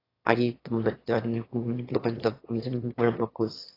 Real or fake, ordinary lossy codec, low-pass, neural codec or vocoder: fake; AAC, 24 kbps; 5.4 kHz; autoencoder, 22.05 kHz, a latent of 192 numbers a frame, VITS, trained on one speaker